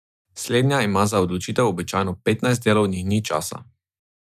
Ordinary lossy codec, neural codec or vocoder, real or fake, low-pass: none; none; real; 14.4 kHz